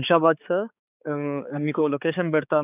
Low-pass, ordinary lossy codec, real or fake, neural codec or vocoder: 3.6 kHz; none; fake; codec, 16 kHz, 4 kbps, X-Codec, HuBERT features, trained on balanced general audio